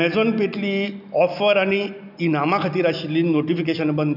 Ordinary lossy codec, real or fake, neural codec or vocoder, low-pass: none; real; none; 5.4 kHz